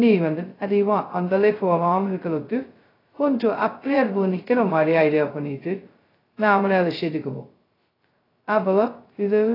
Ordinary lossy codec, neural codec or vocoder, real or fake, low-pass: AAC, 24 kbps; codec, 16 kHz, 0.2 kbps, FocalCodec; fake; 5.4 kHz